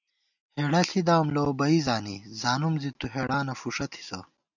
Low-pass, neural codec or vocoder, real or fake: 7.2 kHz; none; real